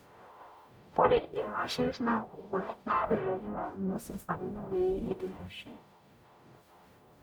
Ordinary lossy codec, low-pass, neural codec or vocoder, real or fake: none; none; codec, 44.1 kHz, 0.9 kbps, DAC; fake